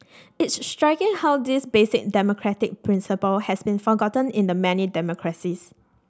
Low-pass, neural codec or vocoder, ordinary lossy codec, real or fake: none; none; none; real